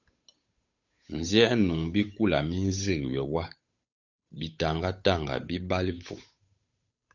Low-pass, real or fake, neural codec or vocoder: 7.2 kHz; fake; codec, 16 kHz, 8 kbps, FunCodec, trained on Chinese and English, 25 frames a second